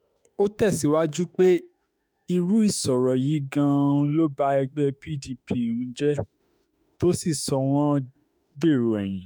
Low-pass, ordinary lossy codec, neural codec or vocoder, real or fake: none; none; autoencoder, 48 kHz, 32 numbers a frame, DAC-VAE, trained on Japanese speech; fake